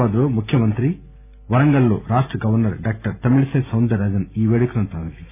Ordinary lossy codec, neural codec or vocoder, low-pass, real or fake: none; none; 3.6 kHz; real